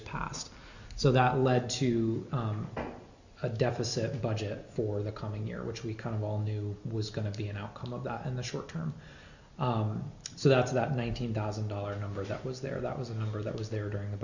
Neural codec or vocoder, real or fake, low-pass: none; real; 7.2 kHz